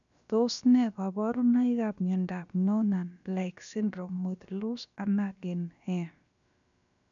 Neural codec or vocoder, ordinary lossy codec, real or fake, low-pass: codec, 16 kHz, about 1 kbps, DyCAST, with the encoder's durations; none; fake; 7.2 kHz